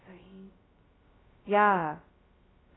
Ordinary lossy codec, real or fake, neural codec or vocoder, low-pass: AAC, 16 kbps; fake; codec, 16 kHz, 0.2 kbps, FocalCodec; 7.2 kHz